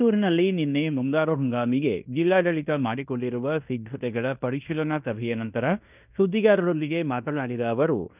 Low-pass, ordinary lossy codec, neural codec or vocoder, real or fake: 3.6 kHz; none; codec, 16 kHz in and 24 kHz out, 0.9 kbps, LongCat-Audio-Codec, fine tuned four codebook decoder; fake